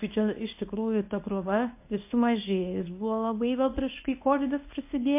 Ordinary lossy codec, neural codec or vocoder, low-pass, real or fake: MP3, 24 kbps; codec, 24 kHz, 0.9 kbps, WavTokenizer, medium speech release version 1; 3.6 kHz; fake